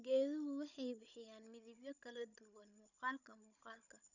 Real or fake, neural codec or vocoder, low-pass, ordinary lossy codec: fake; codec, 16 kHz, 16 kbps, FunCodec, trained on Chinese and English, 50 frames a second; 7.2 kHz; none